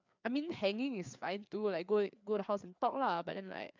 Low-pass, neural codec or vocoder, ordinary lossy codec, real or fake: 7.2 kHz; codec, 16 kHz, 4 kbps, FreqCodec, larger model; MP3, 64 kbps; fake